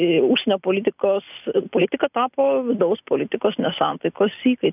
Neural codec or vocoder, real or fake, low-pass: none; real; 3.6 kHz